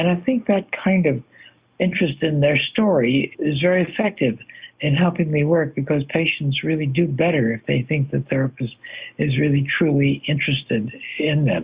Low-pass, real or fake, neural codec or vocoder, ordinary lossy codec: 3.6 kHz; real; none; Opus, 64 kbps